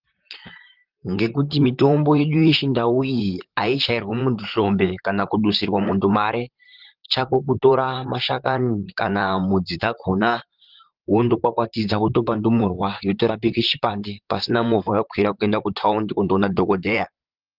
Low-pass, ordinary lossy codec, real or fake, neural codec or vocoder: 5.4 kHz; Opus, 32 kbps; fake; vocoder, 44.1 kHz, 128 mel bands, Pupu-Vocoder